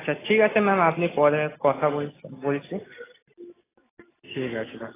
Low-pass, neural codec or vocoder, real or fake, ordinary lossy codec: 3.6 kHz; none; real; AAC, 16 kbps